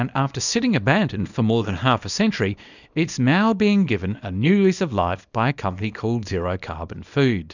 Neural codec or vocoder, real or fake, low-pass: codec, 24 kHz, 0.9 kbps, WavTokenizer, small release; fake; 7.2 kHz